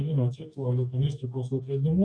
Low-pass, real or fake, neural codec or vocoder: 9.9 kHz; fake; codec, 44.1 kHz, 2.6 kbps, DAC